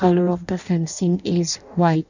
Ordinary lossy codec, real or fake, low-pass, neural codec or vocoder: none; fake; 7.2 kHz; codec, 16 kHz in and 24 kHz out, 0.6 kbps, FireRedTTS-2 codec